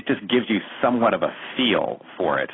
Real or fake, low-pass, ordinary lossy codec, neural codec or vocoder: real; 7.2 kHz; AAC, 16 kbps; none